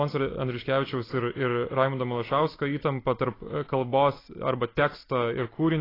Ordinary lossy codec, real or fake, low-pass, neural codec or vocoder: AAC, 24 kbps; real; 5.4 kHz; none